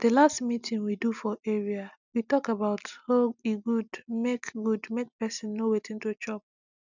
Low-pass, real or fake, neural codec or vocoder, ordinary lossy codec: 7.2 kHz; real; none; none